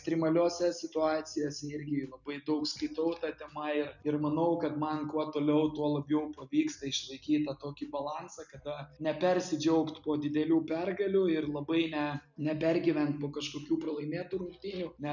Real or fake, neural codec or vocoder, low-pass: real; none; 7.2 kHz